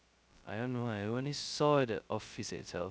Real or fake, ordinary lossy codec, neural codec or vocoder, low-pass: fake; none; codec, 16 kHz, 0.2 kbps, FocalCodec; none